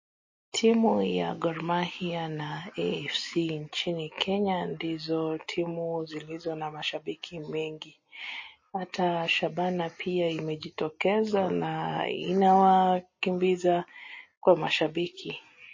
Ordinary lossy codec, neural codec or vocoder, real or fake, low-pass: MP3, 32 kbps; none; real; 7.2 kHz